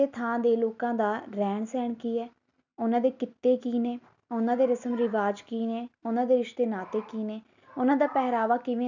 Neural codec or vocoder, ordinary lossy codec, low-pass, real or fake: none; none; 7.2 kHz; real